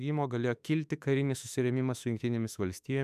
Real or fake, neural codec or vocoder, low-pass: fake; autoencoder, 48 kHz, 32 numbers a frame, DAC-VAE, trained on Japanese speech; 14.4 kHz